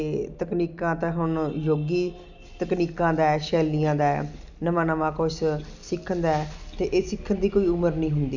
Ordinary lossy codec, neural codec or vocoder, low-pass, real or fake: none; none; 7.2 kHz; real